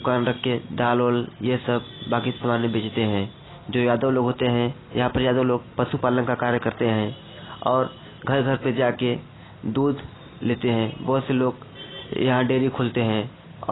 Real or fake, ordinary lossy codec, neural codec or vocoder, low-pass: real; AAC, 16 kbps; none; 7.2 kHz